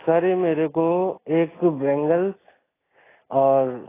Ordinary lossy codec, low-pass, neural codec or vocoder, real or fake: AAC, 16 kbps; 3.6 kHz; none; real